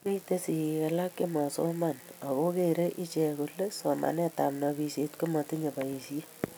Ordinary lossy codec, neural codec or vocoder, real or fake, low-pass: none; none; real; none